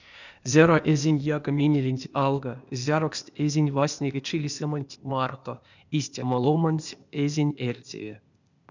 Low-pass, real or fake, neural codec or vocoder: 7.2 kHz; fake; codec, 16 kHz, 0.8 kbps, ZipCodec